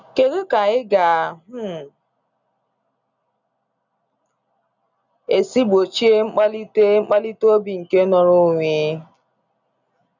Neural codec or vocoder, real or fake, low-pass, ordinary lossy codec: none; real; 7.2 kHz; none